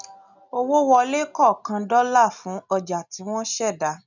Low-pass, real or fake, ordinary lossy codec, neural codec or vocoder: 7.2 kHz; real; none; none